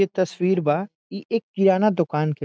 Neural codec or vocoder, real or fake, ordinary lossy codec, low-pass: none; real; none; none